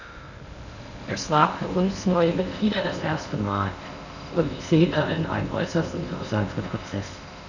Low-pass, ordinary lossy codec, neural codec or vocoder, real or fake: 7.2 kHz; none; codec, 16 kHz in and 24 kHz out, 0.6 kbps, FocalCodec, streaming, 2048 codes; fake